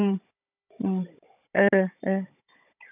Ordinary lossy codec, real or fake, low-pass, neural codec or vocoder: none; fake; 3.6 kHz; codec, 16 kHz, 16 kbps, FunCodec, trained on Chinese and English, 50 frames a second